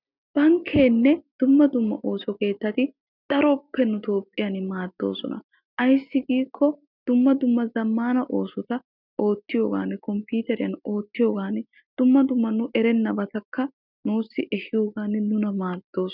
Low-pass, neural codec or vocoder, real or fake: 5.4 kHz; none; real